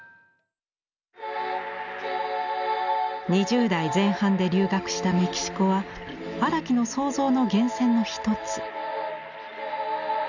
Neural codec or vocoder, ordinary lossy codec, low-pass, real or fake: none; none; 7.2 kHz; real